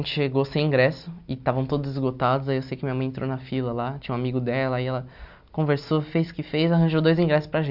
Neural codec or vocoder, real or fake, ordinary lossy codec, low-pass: none; real; none; 5.4 kHz